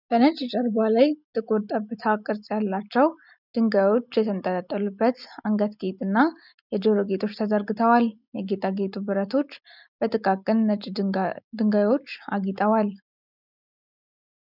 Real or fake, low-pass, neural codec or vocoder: real; 5.4 kHz; none